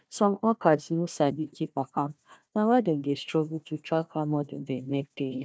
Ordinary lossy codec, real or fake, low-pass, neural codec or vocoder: none; fake; none; codec, 16 kHz, 1 kbps, FunCodec, trained on Chinese and English, 50 frames a second